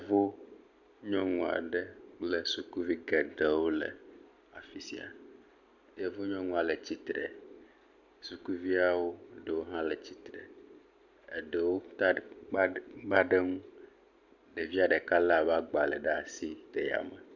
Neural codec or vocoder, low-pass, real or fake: none; 7.2 kHz; real